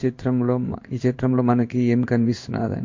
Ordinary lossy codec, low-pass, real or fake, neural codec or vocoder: MP3, 48 kbps; 7.2 kHz; fake; codec, 16 kHz in and 24 kHz out, 1 kbps, XY-Tokenizer